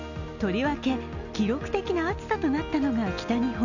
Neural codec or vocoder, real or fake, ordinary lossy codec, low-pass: none; real; none; 7.2 kHz